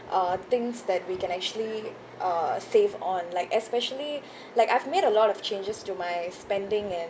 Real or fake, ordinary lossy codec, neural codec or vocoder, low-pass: real; none; none; none